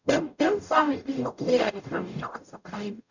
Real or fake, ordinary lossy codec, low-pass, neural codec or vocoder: fake; none; 7.2 kHz; codec, 44.1 kHz, 0.9 kbps, DAC